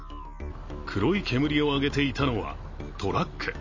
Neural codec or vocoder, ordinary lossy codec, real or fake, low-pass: none; MP3, 32 kbps; real; 7.2 kHz